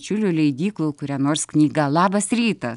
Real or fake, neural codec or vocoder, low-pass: real; none; 10.8 kHz